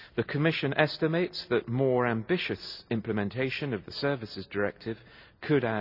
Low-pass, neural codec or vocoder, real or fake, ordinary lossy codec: 5.4 kHz; none; real; none